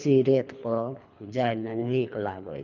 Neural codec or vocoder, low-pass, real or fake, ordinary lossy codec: codec, 24 kHz, 3 kbps, HILCodec; 7.2 kHz; fake; none